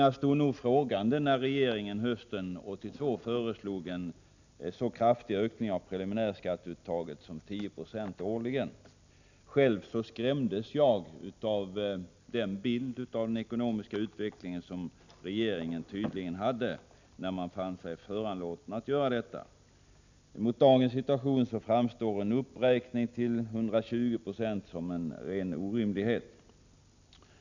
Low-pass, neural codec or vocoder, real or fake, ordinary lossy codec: 7.2 kHz; none; real; none